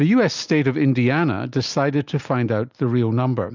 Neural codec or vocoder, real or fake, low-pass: none; real; 7.2 kHz